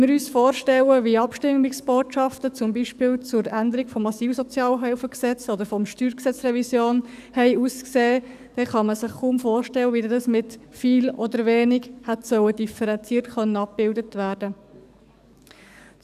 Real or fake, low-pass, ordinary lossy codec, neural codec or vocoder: fake; 14.4 kHz; none; codec, 44.1 kHz, 7.8 kbps, DAC